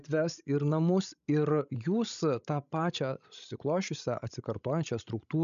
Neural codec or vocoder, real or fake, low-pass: codec, 16 kHz, 16 kbps, FreqCodec, larger model; fake; 7.2 kHz